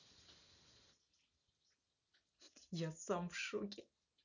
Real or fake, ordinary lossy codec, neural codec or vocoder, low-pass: real; none; none; 7.2 kHz